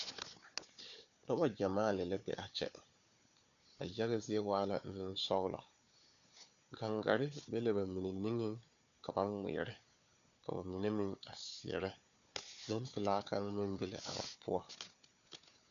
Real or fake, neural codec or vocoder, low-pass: fake; codec, 16 kHz, 4 kbps, FunCodec, trained on Chinese and English, 50 frames a second; 7.2 kHz